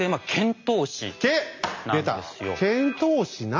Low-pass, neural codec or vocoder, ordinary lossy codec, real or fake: 7.2 kHz; none; MP3, 64 kbps; real